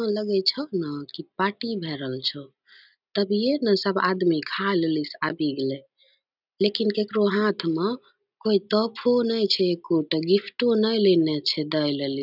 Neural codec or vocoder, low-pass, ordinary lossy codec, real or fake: none; 5.4 kHz; none; real